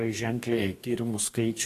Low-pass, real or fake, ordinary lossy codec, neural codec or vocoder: 14.4 kHz; fake; AAC, 48 kbps; codec, 44.1 kHz, 2.6 kbps, DAC